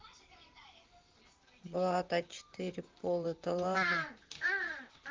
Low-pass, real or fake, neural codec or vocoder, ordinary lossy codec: 7.2 kHz; fake; vocoder, 44.1 kHz, 128 mel bands, Pupu-Vocoder; Opus, 32 kbps